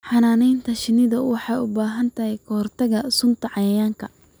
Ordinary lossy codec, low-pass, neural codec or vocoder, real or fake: none; none; none; real